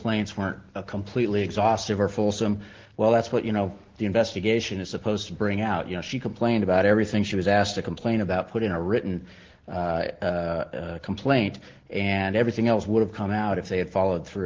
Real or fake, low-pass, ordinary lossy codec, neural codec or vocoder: real; 7.2 kHz; Opus, 16 kbps; none